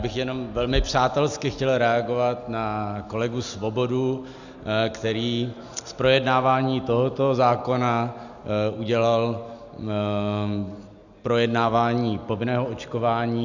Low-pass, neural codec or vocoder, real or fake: 7.2 kHz; none; real